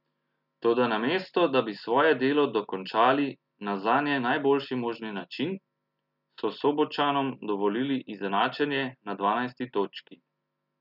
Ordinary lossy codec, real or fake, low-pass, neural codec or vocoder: none; real; 5.4 kHz; none